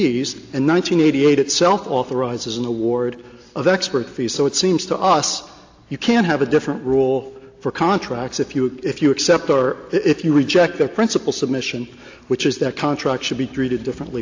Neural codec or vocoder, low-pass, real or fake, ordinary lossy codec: none; 7.2 kHz; real; AAC, 48 kbps